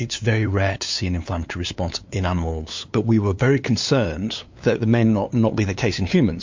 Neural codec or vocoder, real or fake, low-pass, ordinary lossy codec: codec, 16 kHz, 2 kbps, FunCodec, trained on LibriTTS, 25 frames a second; fake; 7.2 kHz; MP3, 48 kbps